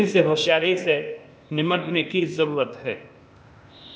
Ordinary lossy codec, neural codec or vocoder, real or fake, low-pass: none; codec, 16 kHz, 0.8 kbps, ZipCodec; fake; none